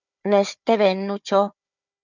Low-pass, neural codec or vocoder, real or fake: 7.2 kHz; codec, 16 kHz, 4 kbps, FunCodec, trained on Chinese and English, 50 frames a second; fake